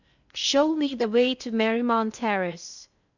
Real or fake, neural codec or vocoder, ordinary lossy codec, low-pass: fake; codec, 16 kHz in and 24 kHz out, 0.6 kbps, FocalCodec, streaming, 2048 codes; none; 7.2 kHz